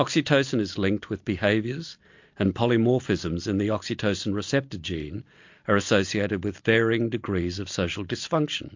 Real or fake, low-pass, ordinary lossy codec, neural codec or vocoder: real; 7.2 kHz; MP3, 48 kbps; none